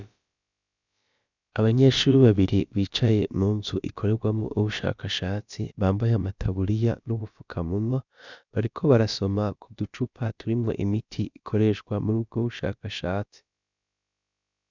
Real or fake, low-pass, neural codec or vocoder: fake; 7.2 kHz; codec, 16 kHz, about 1 kbps, DyCAST, with the encoder's durations